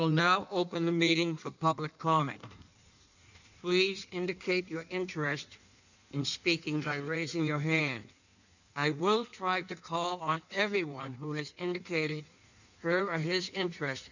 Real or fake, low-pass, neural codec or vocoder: fake; 7.2 kHz; codec, 16 kHz in and 24 kHz out, 1.1 kbps, FireRedTTS-2 codec